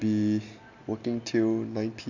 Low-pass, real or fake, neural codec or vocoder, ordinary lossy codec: 7.2 kHz; real; none; none